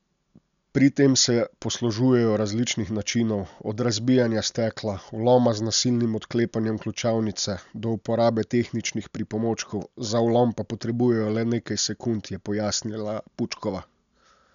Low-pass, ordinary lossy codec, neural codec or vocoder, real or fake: 7.2 kHz; none; none; real